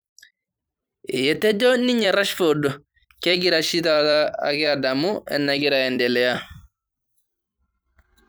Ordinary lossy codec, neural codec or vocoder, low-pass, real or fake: none; vocoder, 44.1 kHz, 128 mel bands every 256 samples, BigVGAN v2; none; fake